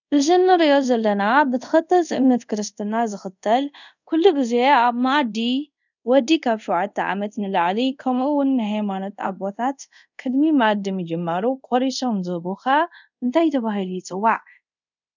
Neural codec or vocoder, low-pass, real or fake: codec, 24 kHz, 0.5 kbps, DualCodec; 7.2 kHz; fake